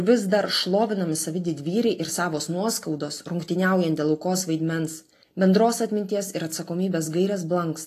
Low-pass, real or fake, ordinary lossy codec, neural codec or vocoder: 14.4 kHz; real; AAC, 48 kbps; none